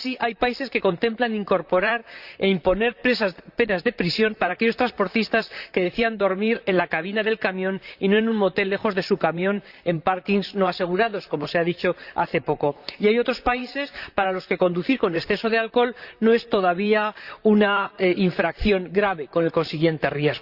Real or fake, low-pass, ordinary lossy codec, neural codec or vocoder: fake; 5.4 kHz; Opus, 64 kbps; vocoder, 44.1 kHz, 128 mel bands, Pupu-Vocoder